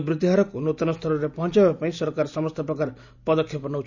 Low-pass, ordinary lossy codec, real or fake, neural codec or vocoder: 7.2 kHz; none; real; none